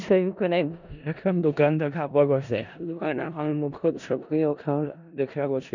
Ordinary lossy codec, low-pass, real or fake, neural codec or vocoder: none; 7.2 kHz; fake; codec, 16 kHz in and 24 kHz out, 0.4 kbps, LongCat-Audio-Codec, four codebook decoder